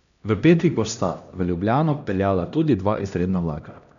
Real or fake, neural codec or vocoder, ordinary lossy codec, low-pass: fake; codec, 16 kHz, 1 kbps, X-Codec, HuBERT features, trained on LibriSpeech; none; 7.2 kHz